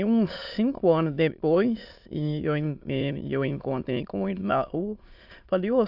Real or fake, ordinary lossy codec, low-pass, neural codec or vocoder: fake; AAC, 48 kbps; 5.4 kHz; autoencoder, 22.05 kHz, a latent of 192 numbers a frame, VITS, trained on many speakers